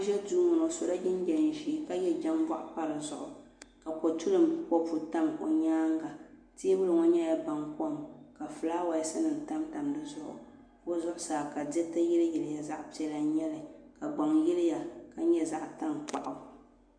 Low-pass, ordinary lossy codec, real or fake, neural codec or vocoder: 9.9 kHz; MP3, 64 kbps; real; none